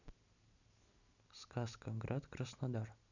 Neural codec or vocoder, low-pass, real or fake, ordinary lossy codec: none; 7.2 kHz; real; none